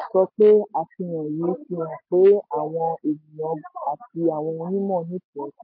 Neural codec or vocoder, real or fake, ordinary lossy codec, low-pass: none; real; MP3, 24 kbps; 5.4 kHz